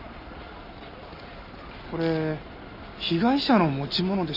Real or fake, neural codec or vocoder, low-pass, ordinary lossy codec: real; none; 5.4 kHz; none